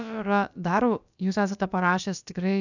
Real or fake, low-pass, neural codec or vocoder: fake; 7.2 kHz; codec, 16 kHz, about 1 kbps, DyCAST, with the encoder's durations